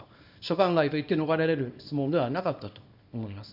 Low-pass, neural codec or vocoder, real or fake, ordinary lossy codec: 5.4 kHz; codec, 24 kHz, 0.9 kbps, WavTokenizer, small release; fake; none